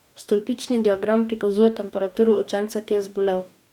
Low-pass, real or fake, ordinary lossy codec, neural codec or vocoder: 19.8 kHz; fake; none; codec, 44.1 kHz, 2.6 kbps, DAC